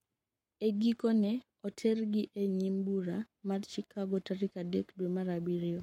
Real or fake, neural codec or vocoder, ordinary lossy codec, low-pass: fake; codec, 44.1 kHz, 7.8 kbps, Pupu-Codec; MP3, 64 kbps; 19.8 kHz